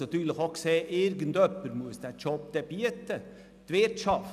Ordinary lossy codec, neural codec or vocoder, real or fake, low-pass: none; none; real; 14.4 kHz